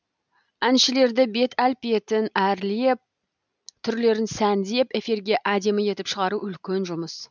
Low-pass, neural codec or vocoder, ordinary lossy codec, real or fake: 7.2 kHz; none; none; real